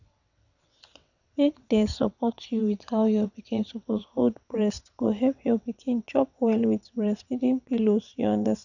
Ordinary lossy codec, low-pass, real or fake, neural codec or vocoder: none; 7.2 kHz; fake; vocoder, 22.05 kHz, 80 mel bands, WaveNeXt